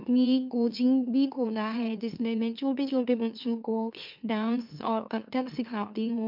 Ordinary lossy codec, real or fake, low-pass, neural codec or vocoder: MP3, 48 kbps; fake; 5.4 kHz; autoencoder, 44.1 kHz, a latent of 192 numbers a frame, MeloTTS